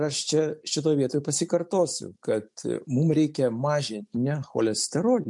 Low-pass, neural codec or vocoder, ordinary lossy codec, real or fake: 10.8 kHz; none; MP3, 64 kbps; real